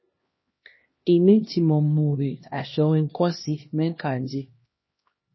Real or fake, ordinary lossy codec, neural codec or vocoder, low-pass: fake; MP3, 24 kbps; codec, 16 kHz, 1 kbps, X-Codec, HuBERT features, trained on LibriSpeech; 7.2 kHz